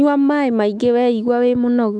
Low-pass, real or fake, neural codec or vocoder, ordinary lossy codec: 9.9 kHz; fake; autoencoder, 48 kHz, 128 numbers a frame, DAC-VAE, trained on Japanese speech; none